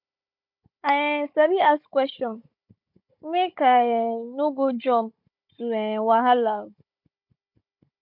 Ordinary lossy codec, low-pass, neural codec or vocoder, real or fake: MP3, 48 kbps; 5.4 kHz; codec, 16 kHz, 16 kbps, FunCodec, trained on Chinese and English, 50 frames a second; fake